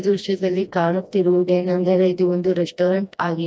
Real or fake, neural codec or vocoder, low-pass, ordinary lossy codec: fake; codec, 16 kHz, 1 kbps, FreqCodec, smaller model; none; none